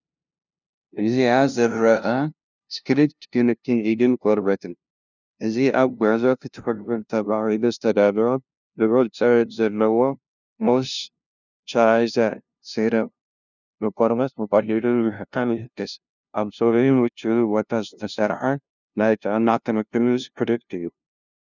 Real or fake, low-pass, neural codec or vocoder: fake; 7.2 kHz; codec, 16 kHz, 0.5 kbps, FunCodec, trained on LibriTTS, 25 frames a second